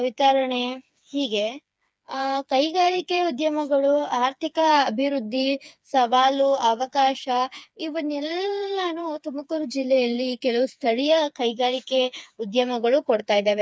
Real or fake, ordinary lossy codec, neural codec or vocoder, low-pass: fake; none; codec, 16 kHz, 4 kbps, FreqCodec, smaller model; none